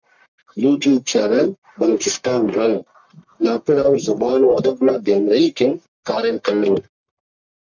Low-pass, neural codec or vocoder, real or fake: 7.2 kHz; codec, 44.1 kHz, 1.7 kbps, Pupu-Codec; fake